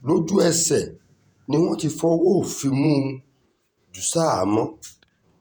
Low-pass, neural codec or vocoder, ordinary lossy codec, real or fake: none; vocoder, 48 kHz, 128 mel bands, Vocos; none; fake